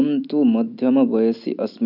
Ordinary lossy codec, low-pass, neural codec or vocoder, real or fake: none; 5.4 kHz; none; real